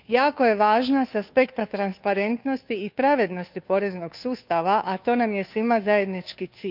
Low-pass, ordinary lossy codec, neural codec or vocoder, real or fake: 5.4 kHz; none; codec, 16 kHz, 2 kbps, FunCodec, trained on Chinese and English, 25 frames a second; fake